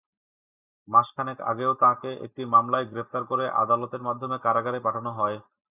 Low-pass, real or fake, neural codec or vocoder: 3.6 kHz; real; none